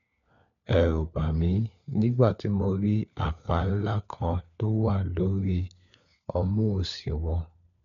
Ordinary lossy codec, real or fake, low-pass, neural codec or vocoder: none; fake; 7.2 kHz; codec, 16 kHz, 4 kbps, FunCodec, trained on LibriTTS, 50 frames a second